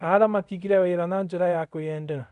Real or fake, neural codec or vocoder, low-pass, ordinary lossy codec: fake; codec, 24 kHz, 0.5 kbps, DualCodec; 10.8 kHz; none